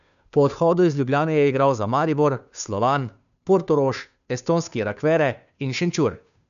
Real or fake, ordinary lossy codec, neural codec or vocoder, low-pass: fake; none; codec, 16 kHz, 2 kbps, FunCodec, trained on Chinese and English, 25 frames a second; 7.2 kHz